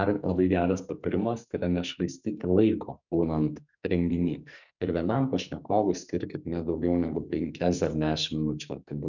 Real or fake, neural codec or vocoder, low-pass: fake; codec, 44.1 kHz, 2.6 kbps, SNAC; 7.2 kHz